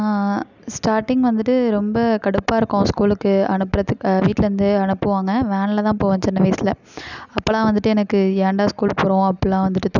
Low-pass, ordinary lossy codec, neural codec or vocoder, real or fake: 7.2 kHz; none; none; real